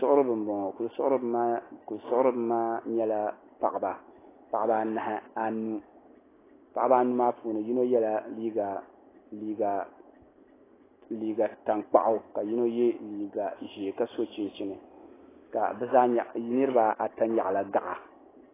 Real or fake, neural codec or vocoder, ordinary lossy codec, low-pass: real; none; AAC, 16 kbps; 3.6 kHz